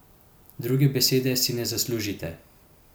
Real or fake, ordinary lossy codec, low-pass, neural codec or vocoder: real; none; none; none